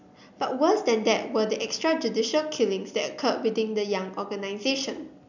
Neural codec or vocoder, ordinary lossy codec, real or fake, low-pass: none; none; real; 7.2 kHz